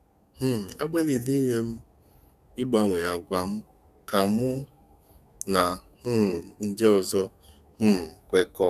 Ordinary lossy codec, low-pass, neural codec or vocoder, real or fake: none; 14.4 kHz; codec, 32 kHz, 1.9 kbps, SNAC; fake